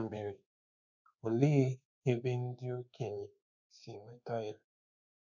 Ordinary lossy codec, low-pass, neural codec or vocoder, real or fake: none; 7.2 kHz; codec, 24 kHz, 1.2 kbps, DualCodec; fake